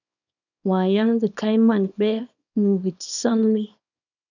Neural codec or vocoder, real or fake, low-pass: codec, 24 kHz, 0.9 kbps, WavTokenizer, small release; fake; 7.2 kHz